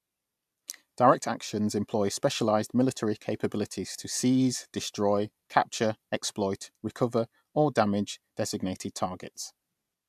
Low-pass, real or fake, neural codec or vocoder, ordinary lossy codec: 14.4 kHz; fake; vocoder, 44.1 kHz, 128 mel bands every 256 samples, BigVGAN v2; AAC, 96 kbps